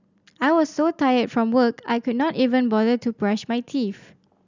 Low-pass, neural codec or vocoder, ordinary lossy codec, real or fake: 7.2 kHz; none; none; real